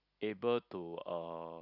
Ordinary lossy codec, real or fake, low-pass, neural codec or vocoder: none; real; 5.4 kHz; none